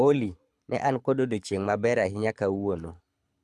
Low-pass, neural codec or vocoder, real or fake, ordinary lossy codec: none; codec, 24 kHz, 6 kbps, HILCodec; fake; none